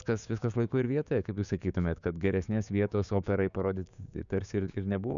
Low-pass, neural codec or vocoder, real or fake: 7.2 kHz; codec, 16 kHz, 6 kbps, DAC; fake